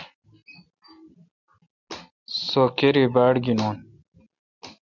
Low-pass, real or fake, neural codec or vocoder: 7.2 kHz; real; none